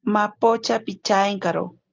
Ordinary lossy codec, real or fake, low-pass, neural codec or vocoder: Opus, 32 kbps; real; 7.2 kHz; none